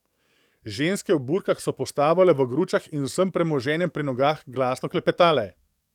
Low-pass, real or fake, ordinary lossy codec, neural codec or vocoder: 19.8 kHz; fake; none; codec, 44.1 kHz, 7.8 kbps, DAC